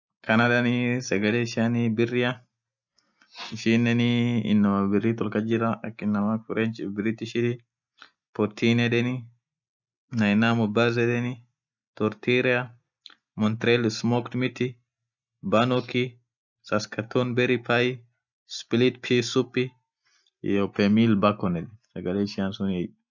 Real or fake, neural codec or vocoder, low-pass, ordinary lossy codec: real; none; 7.2 kHz; none